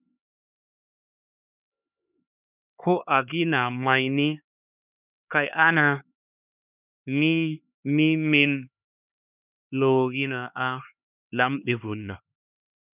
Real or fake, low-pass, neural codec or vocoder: fake; 3.6 kHz; codec, 16 kHz, 2 kbps, X-Codec, HuBERT features, trained on LibriSpeech